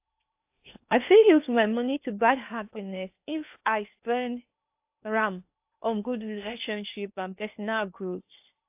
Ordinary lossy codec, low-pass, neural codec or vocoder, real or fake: AAC, 32 kbps; 3.6 kHz; codec, 16 kHz in and 24 kHz out, 0.8 kbps, FocalCodec, streaming, 65536 codes; fake